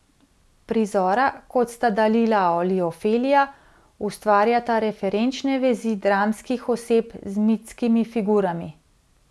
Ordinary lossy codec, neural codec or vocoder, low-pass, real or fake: none; none; none; real